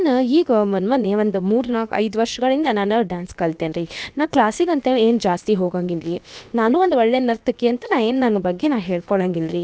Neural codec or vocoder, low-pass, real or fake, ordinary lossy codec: codec, 16 kHz, about 1 kbps, DyCAST, with the encoder's durations; none; fake; none